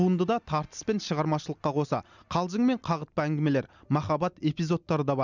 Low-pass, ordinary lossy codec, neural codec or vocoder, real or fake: 7.2 kHz; none; none; real